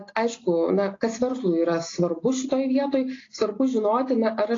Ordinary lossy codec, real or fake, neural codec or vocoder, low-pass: AAC, 32 kbps; real; none; 7.2 kHz